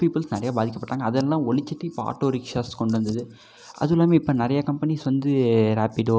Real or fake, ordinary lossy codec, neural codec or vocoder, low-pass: real; none; none; none